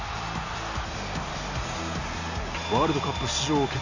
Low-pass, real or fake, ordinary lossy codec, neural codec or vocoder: 7.2 kHz; real; none; none